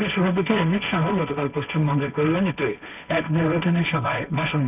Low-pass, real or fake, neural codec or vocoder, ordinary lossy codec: 3.6 kHz; fake; codec, 16 kHz, 1.1 kbps, Voila-Tokenizer; none